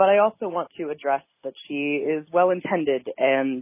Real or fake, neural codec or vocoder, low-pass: real; none; 3.6 kHz